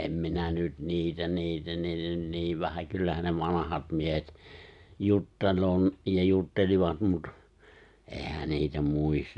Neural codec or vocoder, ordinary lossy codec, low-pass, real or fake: none; none; 10.8 kHz; real